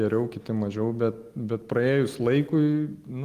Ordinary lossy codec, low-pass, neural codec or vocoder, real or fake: Opus, 24 kbps; 14.4 kHz; none; real